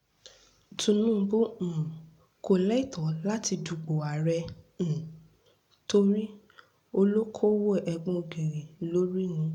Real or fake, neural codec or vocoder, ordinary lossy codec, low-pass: real; none; MP3, 96 kbps; 19.8 kHz